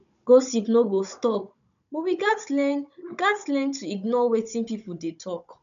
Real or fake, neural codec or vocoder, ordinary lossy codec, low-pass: fake; codec, 16 kHz, 16 kbps, FunCodec, trained on Chinese and English, 50 frames a second; none; 7.2 kHz